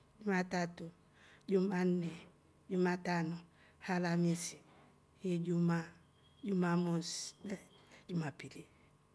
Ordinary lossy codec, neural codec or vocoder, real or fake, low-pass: none; none; real; none